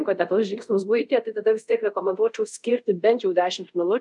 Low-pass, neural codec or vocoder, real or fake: 10.8 kHz; codec, 24 kHz, 0.5 kbps, DualCodec; fake